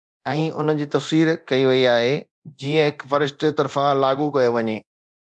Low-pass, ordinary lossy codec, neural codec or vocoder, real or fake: 10.8 kHz; MP3, 96 kbps; codec, 24 kHz, 0.9 kbps, DualCodec; fake